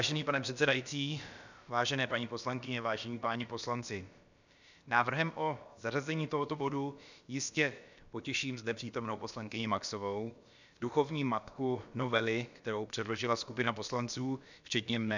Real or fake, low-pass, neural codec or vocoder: fake; 7.2 kHz; codec, 16 kHz, about 1 kbps, DyCAST, with the encoder's durations